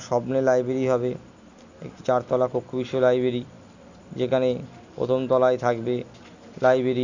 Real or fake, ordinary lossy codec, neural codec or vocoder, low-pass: real; Opus, 64 kbps; none; 7.2 kHz